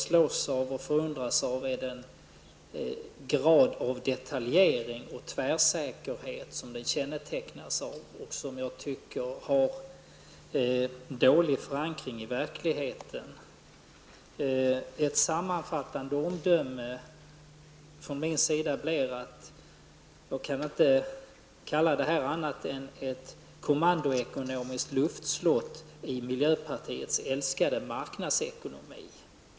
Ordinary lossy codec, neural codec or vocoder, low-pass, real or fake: none; none; none; real